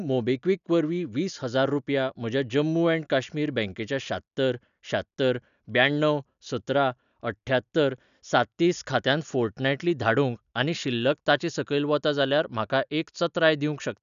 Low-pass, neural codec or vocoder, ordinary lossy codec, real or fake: 7.2 kHz; none; none; real